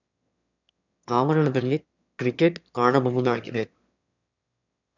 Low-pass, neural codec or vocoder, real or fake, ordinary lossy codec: 7.2 kHz; autoencoder, 22.05 kHz, a latent of 192 numbers a frame, VITS, trained on one speaker; fake; none